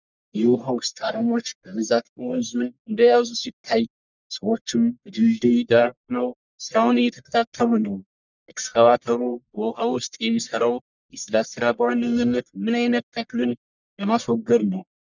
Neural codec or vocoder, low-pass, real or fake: codec, 44.1 kHz, 1.7 kbps, Pupu-Codec; 7.2 kHz; fake